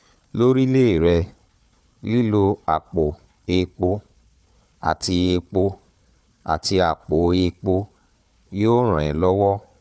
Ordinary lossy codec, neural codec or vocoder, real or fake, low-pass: none; codec, 16 kHz, 4 kbps, FunCodec, trained on Chinese and English, 50 frames a second; fake; none